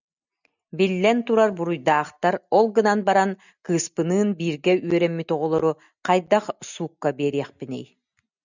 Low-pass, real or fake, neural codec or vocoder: 7.2 kHz; real; none